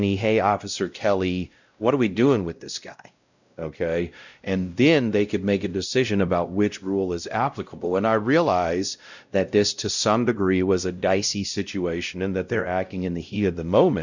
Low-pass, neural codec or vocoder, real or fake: 7.2 kHz; codec, 16 kHz, 0.5 kbps, X-Codec, WavLM features, trained on Multilingual LibriSpeech; fake